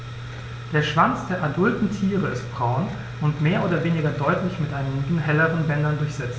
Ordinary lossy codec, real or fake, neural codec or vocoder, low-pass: none; real; none; none